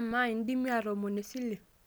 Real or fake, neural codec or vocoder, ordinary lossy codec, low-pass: real; none; none; none